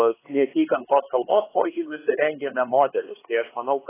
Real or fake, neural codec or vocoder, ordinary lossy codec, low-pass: fake; codec, 16 kHz, 4 kbps, X-Codec, HuBERT features, trained on LibriSpeech; AAC, 16 kbps; 3.6 kHz